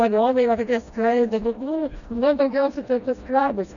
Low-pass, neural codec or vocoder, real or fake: 7.2 kHz; codec, 16 kHz, 1 kbps, FreqCodec, smaller model; fake